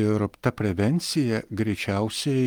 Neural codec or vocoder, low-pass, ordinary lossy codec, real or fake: none; 19.8 kHz; Opus, 32 kbps; real